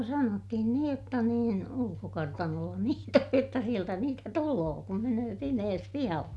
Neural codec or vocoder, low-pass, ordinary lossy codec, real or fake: none; none; none; real